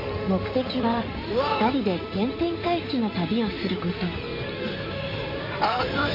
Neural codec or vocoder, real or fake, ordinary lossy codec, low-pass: codec, 16 kHz in and 24 kHz out, 2.2 kbps, FireRedTTS-2 codec; fake; AAC, 48 kbps; 5.4 kHz